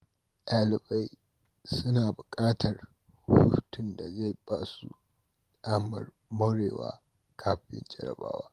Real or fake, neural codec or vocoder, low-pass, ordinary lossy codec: fake; vocoder, 44.1 kHz, 128 mel bands every 512 samples, BigVGAN v2; 14.4 kHz; Opus, 24 kbps